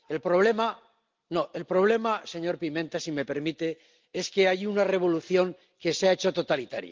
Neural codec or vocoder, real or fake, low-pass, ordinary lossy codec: none; real; 7.2 kHz; Opus, 32 kbps